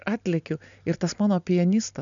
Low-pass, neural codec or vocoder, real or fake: 7.2 kHz; none; real